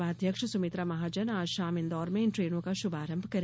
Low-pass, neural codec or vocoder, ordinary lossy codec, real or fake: none; none; none; real